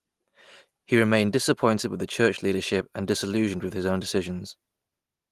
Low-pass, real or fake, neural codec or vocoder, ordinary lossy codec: 14.4 kHz; real; none; Opus, 24 kbps